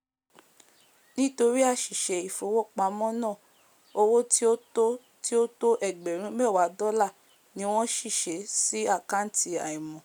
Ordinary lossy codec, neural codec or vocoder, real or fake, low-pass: none; none; real; none